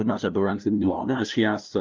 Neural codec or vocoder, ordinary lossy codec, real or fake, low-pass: codec, 16 kHz, 0.5 kbps, FunCodec, trained on LibriTTS, 25 frames a second; Opus, 24 kbps; fake; 7.2 kHz